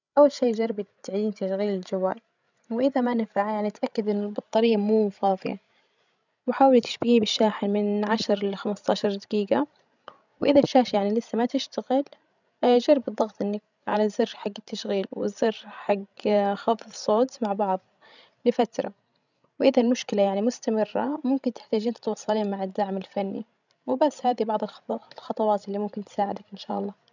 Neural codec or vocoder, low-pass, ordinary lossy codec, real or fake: codec, 16 kHz, 16 kbps, FreqCodec, larger model; 7.2 kHz; none; fake